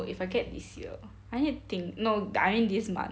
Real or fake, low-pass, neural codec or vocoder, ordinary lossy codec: real; none; none; none